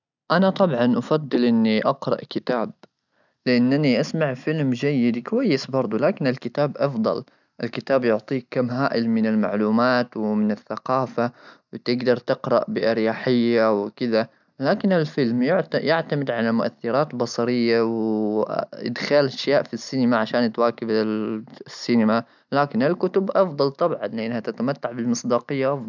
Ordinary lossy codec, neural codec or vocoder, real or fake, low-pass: none; none; real; 7.2 kHz